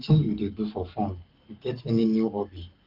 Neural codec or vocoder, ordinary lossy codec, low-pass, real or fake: codec, 44.1 kHz, 7.8 kbps, Pupu-Codec; Opus, 32 kbps; 5.4 kHz; fake